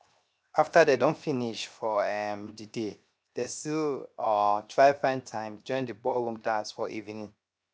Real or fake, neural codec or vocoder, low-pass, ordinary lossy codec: fake; codec, 16 kHz, 0.7 kbps, FocalCodec; none; none